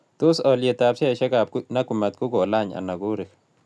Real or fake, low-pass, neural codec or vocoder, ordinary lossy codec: real; none; none; none